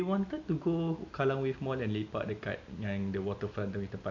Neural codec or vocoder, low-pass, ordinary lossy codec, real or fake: none; 7.2 kHz; AAC, 48 kbps; real